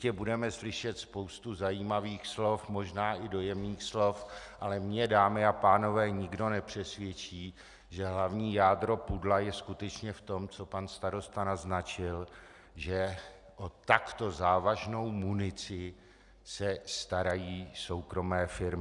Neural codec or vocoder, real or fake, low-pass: none; real; 10.8 kHz